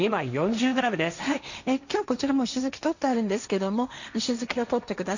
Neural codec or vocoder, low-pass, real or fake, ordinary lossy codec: codec, 16 kHz, 1.1 kbps, Voila-Tokenizer; 7.2 kHz; fake; none